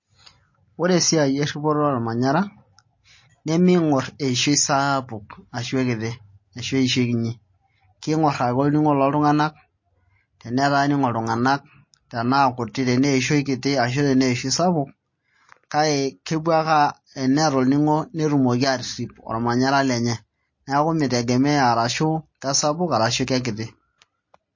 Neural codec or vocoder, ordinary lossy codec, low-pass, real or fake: none; MP3, 32 kbps; 7.2 kHz; real